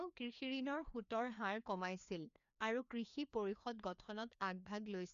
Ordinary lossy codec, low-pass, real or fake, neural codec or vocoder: none; 7.2 kHz; fake; codec, 16 kHz, 2 kbps, FreqCodec, larger model